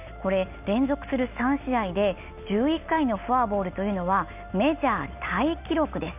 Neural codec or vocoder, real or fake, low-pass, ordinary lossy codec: none; real; 3.6 kHz; none